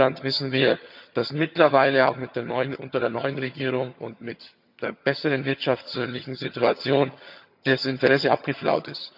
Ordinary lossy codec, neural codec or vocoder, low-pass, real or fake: none; vocoder, 22.05 kHz, 80 mel bands, HiFi-GAN; 5.4 kHz; fake